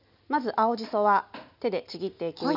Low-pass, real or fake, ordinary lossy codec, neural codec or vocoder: 5.4 kHz; real; none; none